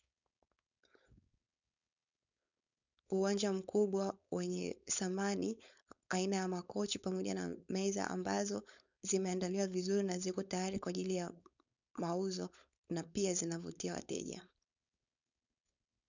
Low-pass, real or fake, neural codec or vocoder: 7.2 kHz; fake; codec, 16 kHz, 4.8 kbps, FACodec